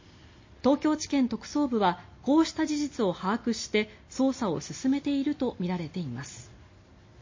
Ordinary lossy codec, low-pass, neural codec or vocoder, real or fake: MP3, 32 kbps; 7.2 kHz; none; real